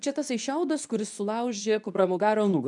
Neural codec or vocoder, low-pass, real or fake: codec, 24 kHz, 0.9 kbps, WavTokenizer, medium speech release version 2; 10.8 kHz; fake